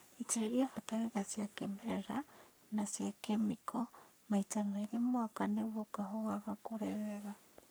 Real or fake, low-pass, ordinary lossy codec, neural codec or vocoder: fake; none; none; codec, 44.1 kHz, 3.4 kbps, Pupu-Codec